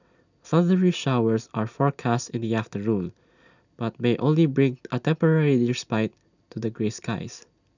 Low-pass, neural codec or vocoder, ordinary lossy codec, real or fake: 7.2 kHz; none; none; real